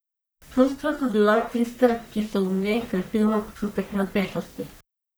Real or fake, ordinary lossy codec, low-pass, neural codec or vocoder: fake; none; none; codec, 44.1 kHz, 1.7 kbps, Pupu-Codec